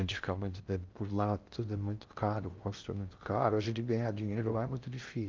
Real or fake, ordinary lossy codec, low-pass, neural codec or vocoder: fake; Opus, 24 kbps; 7.2 kHz; codec, 16 kHz in and 24 kHz out, 0.6 kbps, FocalCodec, streaming, 2048 codes